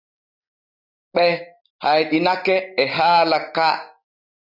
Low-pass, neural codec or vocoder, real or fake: 5.4 kHz; none; real